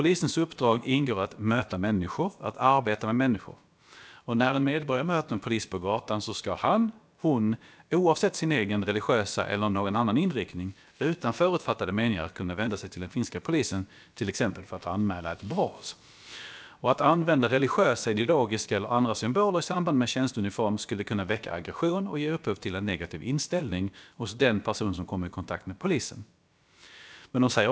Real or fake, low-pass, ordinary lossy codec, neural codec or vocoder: fake; none; none; codec, 16 kHz, about 1 kbps, DyCAST, with the encoder's durations